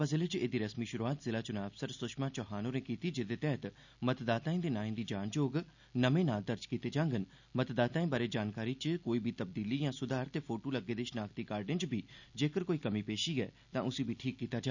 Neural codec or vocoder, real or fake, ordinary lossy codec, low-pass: none; real; none; 7.2 kHz